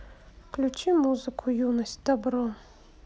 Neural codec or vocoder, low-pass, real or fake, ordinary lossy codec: none; none; real; none